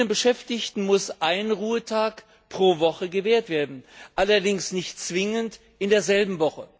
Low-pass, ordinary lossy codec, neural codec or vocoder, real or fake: none; none; none; real